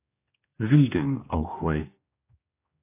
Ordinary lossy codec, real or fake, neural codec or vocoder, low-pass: AAC, 24 kbps; fake; codec, 16 kHz, 1 kbps, X-Codec, HuBERT features, trained on general audio; 3.6 kHz